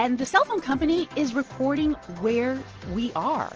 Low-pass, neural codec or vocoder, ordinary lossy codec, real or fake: 7.2 kHz; none; Opus, 16 kbps; real